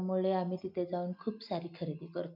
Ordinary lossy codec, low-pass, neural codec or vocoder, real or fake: none; 5.4 kHz; none; real